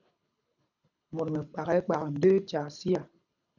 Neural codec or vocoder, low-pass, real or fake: codec, 24 kHz, 6 kbps, HILCodec; 7.2 kHz; fake